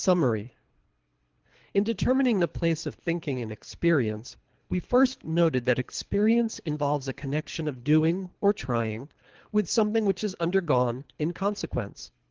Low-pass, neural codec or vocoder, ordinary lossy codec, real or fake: 7.2 kHz; codec, 24 kHz, 3 kbps, HILCodec; Opus, 16 kbps; fake